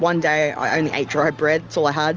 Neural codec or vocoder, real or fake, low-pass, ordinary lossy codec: none; real; 7.2 kHz; Opus, 24 kbps